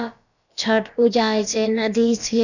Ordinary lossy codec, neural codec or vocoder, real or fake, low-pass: none; codec, 16 kHz, about 1 kbps, DyCAST, with the encoder's durations; fake; 7.2 kHz